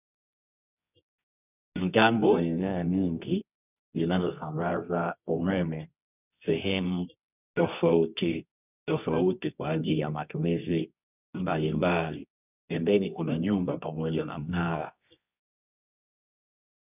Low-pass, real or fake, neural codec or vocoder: 3.6 kHz; fake; codec, 24 kHz, 0.9 kbps, WavTokenizer, medium music audio release